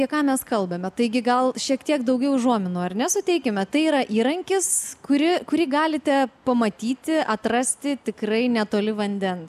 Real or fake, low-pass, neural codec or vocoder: real; 14.4 kHz; none